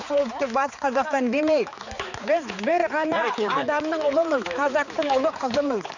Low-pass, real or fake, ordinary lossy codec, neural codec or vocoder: 7.2 kHz; fake; none; codec, 16 kHz, 4 kbps, X-Codec, HuBERT features, trained on balanced general audio